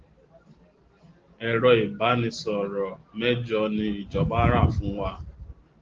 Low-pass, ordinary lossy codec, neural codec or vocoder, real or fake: 7.2 kHz; Opus, 16 kbps; none; real